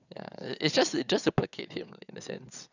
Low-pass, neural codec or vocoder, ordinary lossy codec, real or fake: 7.2 kHz; vocoder, 22.05 kHz, 80 mel bands, WaveNeXt; none; fake